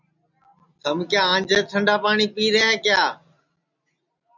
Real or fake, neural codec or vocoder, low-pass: real; none; 7.2 kHz